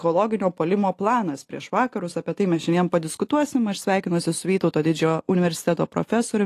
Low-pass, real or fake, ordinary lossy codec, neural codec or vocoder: 14.4 kHz; real; AAC, 48 kbps; none